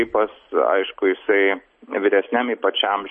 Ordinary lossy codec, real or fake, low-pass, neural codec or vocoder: MP3, 32 kbps; real; 9.9 kHz; none